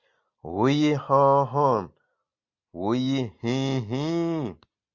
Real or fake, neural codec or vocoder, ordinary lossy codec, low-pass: real; none; Opus, 64 kbps; 7.2 kHz